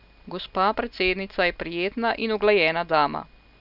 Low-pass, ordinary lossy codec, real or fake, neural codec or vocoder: 5.4 kHz; none; real; none